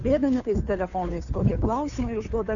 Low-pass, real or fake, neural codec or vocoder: 7.2 kHz; fake; codec, 16 kHz, 2 kbps, FunCodec, trained on Chinese and English, 25 frames a second